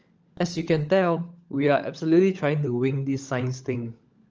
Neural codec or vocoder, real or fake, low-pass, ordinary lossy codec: codec, 16 kHz, 16 kbps, FunCodec, trained on LibriTTS, 50 frames a second; fake; 7.2 kHz; Opus, 24 kbps